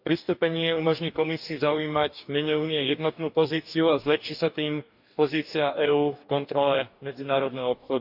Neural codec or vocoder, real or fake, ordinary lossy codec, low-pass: codec, 44.1 kHz, 2.6 kbps, DAC; fake; none; 5.4 kHz